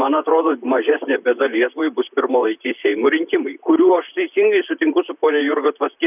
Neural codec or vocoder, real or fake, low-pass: vocoder, 44.1 kHz, 128 mel bands, Pupu-Vocoder; fake; 3.6 kHz